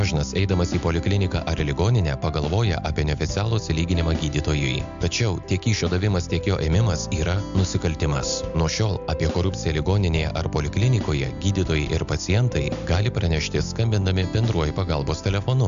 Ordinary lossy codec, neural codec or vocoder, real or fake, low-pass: MP3, 64 kbps; none; real; 7.2 kHz